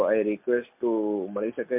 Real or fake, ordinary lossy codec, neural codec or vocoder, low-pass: real; MP3, 32 kbps; none; 3.6 kHz